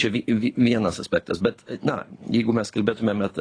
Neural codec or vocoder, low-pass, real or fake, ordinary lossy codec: codec, 24 kHz, 6 kbps, HILCodec; 9.9 kHz; fake; AAC, 32 kbps